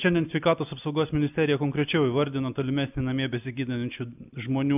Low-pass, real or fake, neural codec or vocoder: 3.6 kHz; real; none